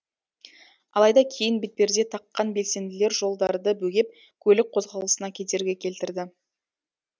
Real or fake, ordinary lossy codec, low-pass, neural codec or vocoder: real; none; none; none